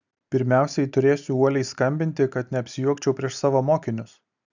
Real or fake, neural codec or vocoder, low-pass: real; none; 7.2 kHz